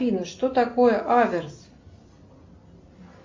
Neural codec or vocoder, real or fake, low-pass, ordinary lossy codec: none; real; 7.2 kHz; MP3, 64 kbps